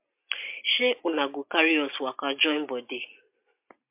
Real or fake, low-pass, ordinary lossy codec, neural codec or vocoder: real; 3.6 kHz; MP3, 32 kbps; none